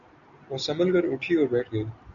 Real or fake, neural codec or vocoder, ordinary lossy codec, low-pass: real; none; MP3, 96 kbps; 7.2 kHz